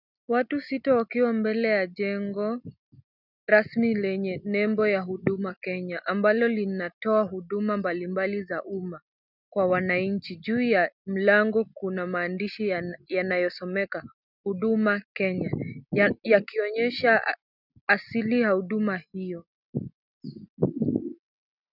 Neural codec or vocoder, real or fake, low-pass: none; real; 5.4 kHz